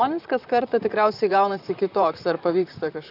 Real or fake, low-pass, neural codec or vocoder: fake; 5.4 kHz; vocoder, 44.1 kHz, 128 mel bands, Pupu-Vocoder